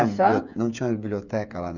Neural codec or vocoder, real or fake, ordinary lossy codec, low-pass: codec, 16 kHz, 8 kbps, FreqCodec, smaller model; fake; none; 7.2 kHz